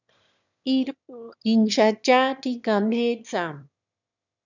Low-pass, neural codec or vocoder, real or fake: 7.2 kHz; autoencoder, 22.05 kHz, a latent of 192 numbers a frame, VITS, trained on one speaker; fake